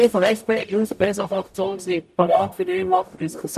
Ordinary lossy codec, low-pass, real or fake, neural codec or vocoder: none; 14.4 kHz; fake; codec, 44.1 kHz, 0.9 kbps, DAC